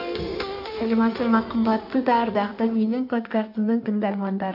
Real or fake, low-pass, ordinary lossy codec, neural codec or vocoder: fake; 5.4 kHz; none; codec, 16 kHz in and 24 kHz out, 1.1 kbps, FireRedTTS-2 codec